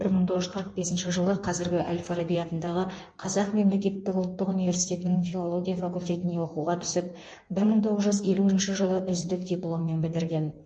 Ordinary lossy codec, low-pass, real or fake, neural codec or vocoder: MP3, 48 kbps; 9.9 kHz; fake; codec, 16 kHz in and 24 kHz out, 1.1 kbps, FireRedTTS-2 codec